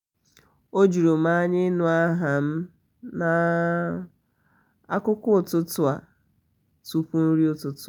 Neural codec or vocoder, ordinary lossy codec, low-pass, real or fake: none; none; 19.8 kHz; real